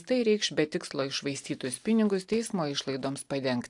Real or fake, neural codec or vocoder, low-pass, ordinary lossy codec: real; none; 10.8 kHz; AAC, 64 kbps